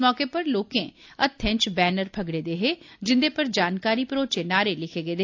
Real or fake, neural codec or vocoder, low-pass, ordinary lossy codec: real; none; 7.2 kHz; AAC, 48 kbps